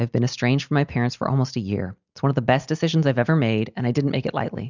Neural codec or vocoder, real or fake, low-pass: none; real; 7.2 kHz